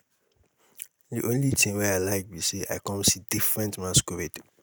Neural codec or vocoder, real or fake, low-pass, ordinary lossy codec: none; real; none; none